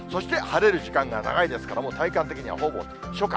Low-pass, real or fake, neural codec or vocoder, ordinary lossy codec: none; real; none; none